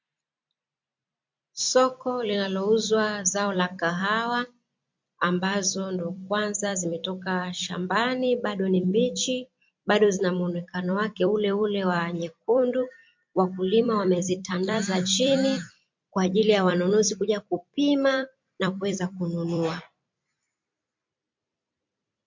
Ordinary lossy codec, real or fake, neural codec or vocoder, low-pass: MP3, 48 kbps; fake; vocoder, 44.1 kHz, 128 mel bands every 256 samples, BigVGAN v2; 7.2 kHz